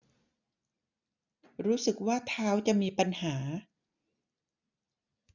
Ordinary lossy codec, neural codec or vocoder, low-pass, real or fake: none; none; 7.2 kHz; real